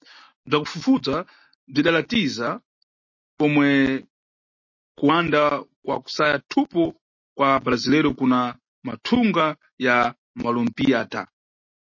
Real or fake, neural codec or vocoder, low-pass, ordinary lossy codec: real; none; 7.2 kHz; MP3, 32 kbps